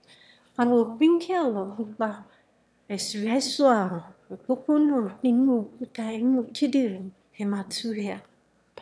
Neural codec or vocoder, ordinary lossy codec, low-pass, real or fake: autoencoder, 22.05 kHz, a latent of 192 numbers a frame, VITS, trained on one speaker; none; none; fake